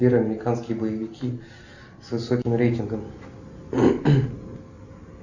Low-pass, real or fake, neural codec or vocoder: 7.2 kHz; real; none